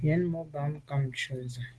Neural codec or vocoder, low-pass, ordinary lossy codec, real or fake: none; 10.8 kHz; Opus, 16 kbps; real